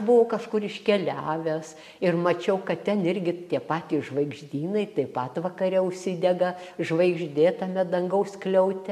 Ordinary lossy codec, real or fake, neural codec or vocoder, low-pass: MP3, 96 kbps; real; none; 14.4 kHz